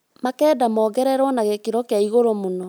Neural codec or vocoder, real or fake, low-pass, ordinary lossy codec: none; real; none; none